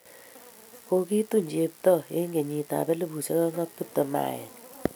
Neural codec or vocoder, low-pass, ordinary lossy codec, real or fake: none; none; none; real